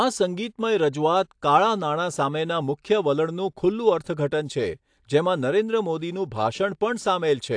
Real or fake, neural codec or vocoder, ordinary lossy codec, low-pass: real; none; AAC, 64 kbps; 9.9 kHz